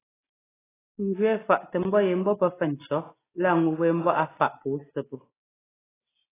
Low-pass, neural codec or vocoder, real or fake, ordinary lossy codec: 3.6 kHz; none; real; AAC, 16 kbps